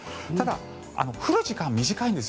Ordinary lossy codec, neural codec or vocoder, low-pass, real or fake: none; none; none; real